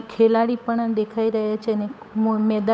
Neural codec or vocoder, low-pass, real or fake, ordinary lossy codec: codec, 16 kHz, 8 kbps, FunCodec, trained on Chinese and English, 25 frames a second; none; fake; none